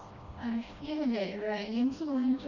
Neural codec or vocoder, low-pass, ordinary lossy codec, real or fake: codec, 16 kHz, 1 kbps, FreqCodec, smaller model; 7.2 kHz; none; fake